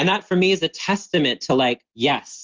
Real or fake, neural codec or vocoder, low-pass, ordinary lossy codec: real; none; 7.2 kHz; Opus, 32 kbps